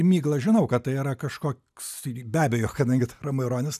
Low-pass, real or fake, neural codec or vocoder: 14.4 kHz; real; none